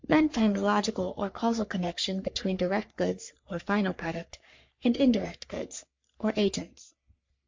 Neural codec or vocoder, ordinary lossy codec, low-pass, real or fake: codec, 44.1 kHz, 3.4 kbps, Pupu-Codec; MP3, 48 kbps; 7.2 kHz; fake